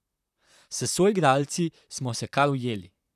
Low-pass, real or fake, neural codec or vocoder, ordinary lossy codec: 14.4 kHz; fake; vocoder, 44.1 kHz, 128 mel bands, Pupu-Vocoder; none